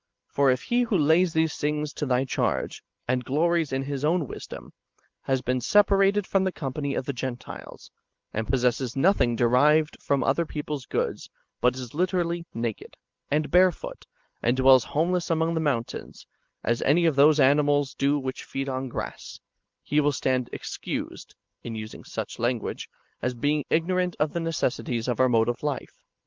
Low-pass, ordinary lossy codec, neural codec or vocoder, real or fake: 7.2 kHz; Opus, 32 kbps; none; real